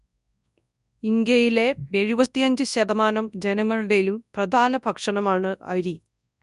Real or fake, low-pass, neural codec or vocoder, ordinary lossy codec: fake; 10.8 kHz; codec, 24 kHz, 0.9 kbps, WavTokenizer, large speech release; none